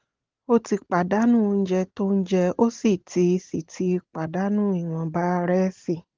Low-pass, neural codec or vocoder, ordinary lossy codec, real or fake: 7.2 kHz; none; Opus, 16 kbps; real